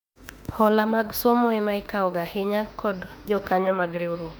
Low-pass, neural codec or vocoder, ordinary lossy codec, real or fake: 19.8 kHz; autoencoder, 48 kHz, 32 numbers a frame, DAC-VAE, trained on Japanese speech; none; fake